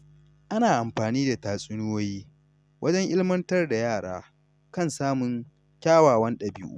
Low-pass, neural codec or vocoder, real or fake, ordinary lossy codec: none; none; real; none